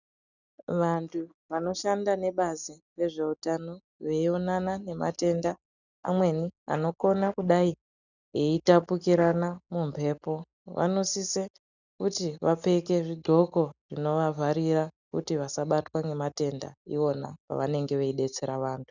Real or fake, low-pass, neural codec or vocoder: real; 7.2 kHz; none